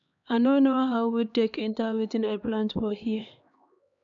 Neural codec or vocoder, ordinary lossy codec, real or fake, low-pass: codec, 16 kHz, 2 kbps, X-Codec, HuBERT features, trained on LibriSpeech; none; fake; 7.2 kHz